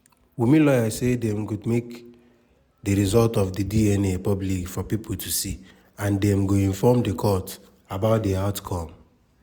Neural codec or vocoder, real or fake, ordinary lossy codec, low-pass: none; real; none; none